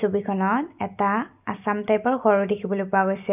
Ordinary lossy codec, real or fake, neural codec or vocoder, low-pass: none; real; none; 3.6 kHz